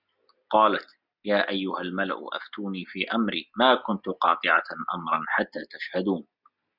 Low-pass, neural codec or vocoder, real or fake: 5.4 kHz; none; real